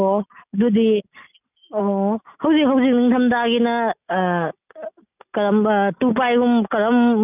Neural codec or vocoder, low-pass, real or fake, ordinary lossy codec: none; 3.6 kHz; real; none